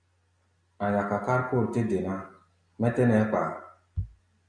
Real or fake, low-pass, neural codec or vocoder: real; 9.9 kHz; none